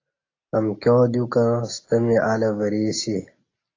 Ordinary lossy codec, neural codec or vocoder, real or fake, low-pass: AAC, 32 kbps; none; real; 7.2 kHz